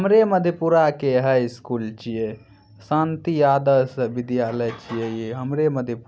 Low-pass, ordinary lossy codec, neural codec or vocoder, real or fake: none; none; none; real